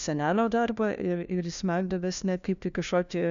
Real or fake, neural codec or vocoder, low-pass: fake; codec, 16 kHz, 1 kbps, FunCodec, trained on LibriTTS, 50 frames a second; 7.2 kHz